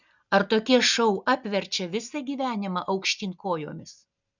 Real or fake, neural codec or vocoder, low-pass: real; none; 7.2 kHz